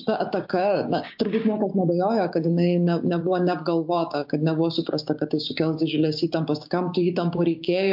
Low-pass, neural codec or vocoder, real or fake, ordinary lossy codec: 5.4 kHz; codec, 16 kHz, 6 kbps, DAC; fake; MP3, 48 kbps